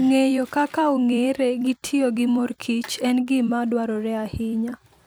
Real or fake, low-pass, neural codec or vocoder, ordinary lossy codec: fake; none; vocoder, 44.1 kHz, 128 mel bands every 256 samples, BigVGAN v2; none